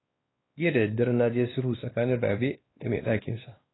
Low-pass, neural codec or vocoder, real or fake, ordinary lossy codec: 7.2 kHz; codec, 16 kHz, 2 kbps, X-Codec, WavLM features, trained on Multilingual LibriSpeech; fake; AAC, 16 kbps